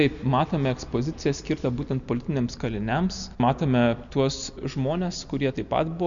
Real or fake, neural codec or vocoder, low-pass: real; none; 7.2 kHz